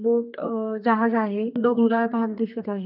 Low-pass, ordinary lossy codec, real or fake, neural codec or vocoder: 5.4 kHz; none; fake; codec, 32 kHz, 1.9 kbps, SNAC